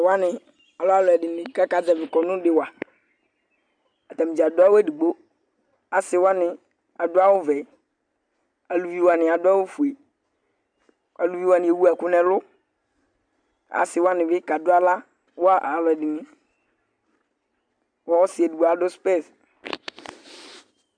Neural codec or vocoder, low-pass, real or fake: none; 9.9 kHz; real